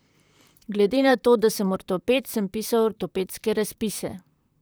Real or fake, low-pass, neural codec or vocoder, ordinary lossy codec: fake; none; vocoder, 44.1 kHz, 128 mel bands, Pupu-Vocoder; none